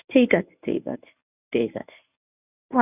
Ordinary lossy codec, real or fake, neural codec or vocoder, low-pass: none; fake; codec, 24 kHz, 0.9 kbps, WavTokenizer, medium speech release version 1; 3.6 kHz